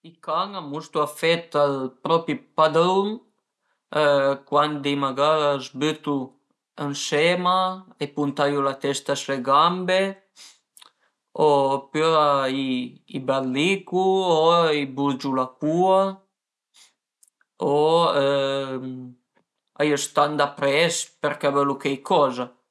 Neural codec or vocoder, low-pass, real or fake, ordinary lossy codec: none; none; real; none